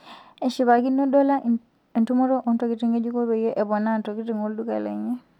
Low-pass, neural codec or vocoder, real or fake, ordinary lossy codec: 19.8 kHz; none; real; none